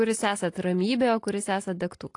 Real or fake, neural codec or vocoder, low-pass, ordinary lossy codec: real; none; 10.8 kHz; AAC, 48 kbps